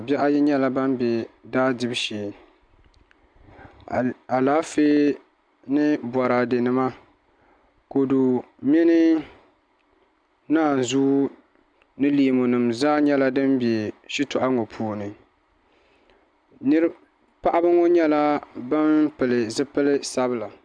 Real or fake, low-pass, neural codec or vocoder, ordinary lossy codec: real; 9.9 kHz; none; MP3, 96 kbps